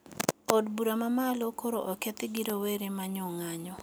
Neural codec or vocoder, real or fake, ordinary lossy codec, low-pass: none; real; none; none